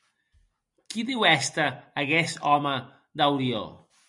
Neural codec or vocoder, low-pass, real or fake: none; 10.8 kHz; real